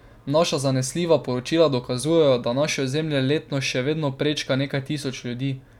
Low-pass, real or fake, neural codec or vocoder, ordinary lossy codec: 19.8 kHz; real; none; none